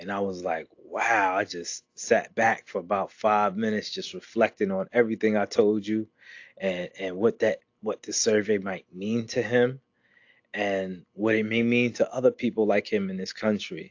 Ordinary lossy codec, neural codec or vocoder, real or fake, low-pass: AAC, 48 kbps; none; real; 7.2 kHz